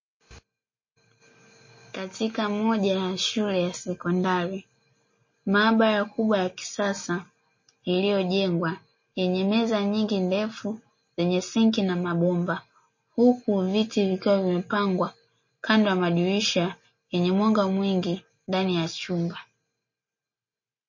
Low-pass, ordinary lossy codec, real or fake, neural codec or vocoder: 7.2 kHz; MP3, 32 kbps; real; none